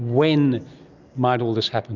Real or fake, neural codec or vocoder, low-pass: real; none; 7.2 kHz